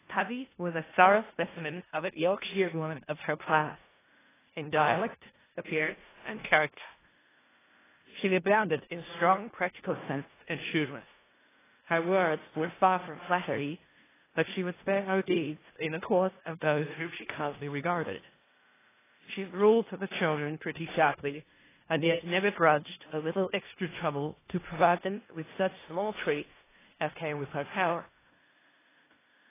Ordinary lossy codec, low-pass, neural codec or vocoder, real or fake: AAC, 16 kbps; 3.6 kHz; codec, 16 kHz in and 24 kHz out, 0.4 kbps, LongCat-Audio-Codec, four codebook decoder; fake